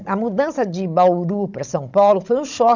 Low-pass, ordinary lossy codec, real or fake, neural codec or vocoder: 7.2 kHz; none; fake; codec, 16 kHz, 16 kbps, FunCodec, trained on Chinese and English, 50 frames a second